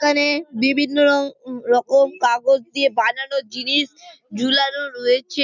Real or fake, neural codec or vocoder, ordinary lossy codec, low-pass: real; none; none; 7.2 kHz